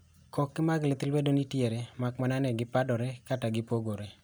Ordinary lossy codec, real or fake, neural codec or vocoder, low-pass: none; real; none; none